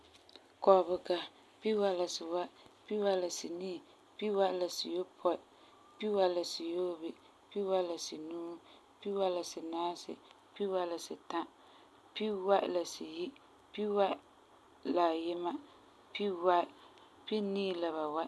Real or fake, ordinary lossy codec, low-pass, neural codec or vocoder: real; none; none; none